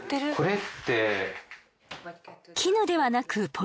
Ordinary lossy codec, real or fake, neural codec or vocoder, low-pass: none; real; none; none